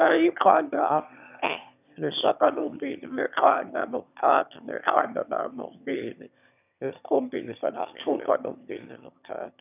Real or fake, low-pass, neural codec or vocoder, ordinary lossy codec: fake; 3.6 kHz; autoencoder, 22.05 kHz, a latent of 192 numbers a frame, VITS, trained on one speaker; none